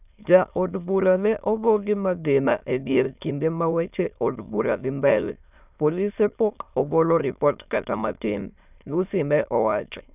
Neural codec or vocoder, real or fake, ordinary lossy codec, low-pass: autoencoder, 22.05 kHz, a latent of 192 numbers a frame, VITS, trained on many speakers; fake; none; 3.6 kHz